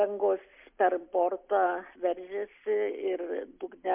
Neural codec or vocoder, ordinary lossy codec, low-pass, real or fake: none; MP3, 32 kbps; 3.6 kHz; real